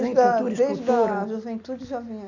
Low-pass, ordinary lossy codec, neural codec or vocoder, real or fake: 7.2 kHz; none; none; real